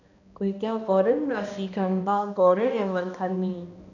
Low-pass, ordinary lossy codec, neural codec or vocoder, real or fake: 7.2 kHz; none; codec, 16 kHz, 1 kbps, X-Codec, HuBERT features, trained on balanced general audio; fake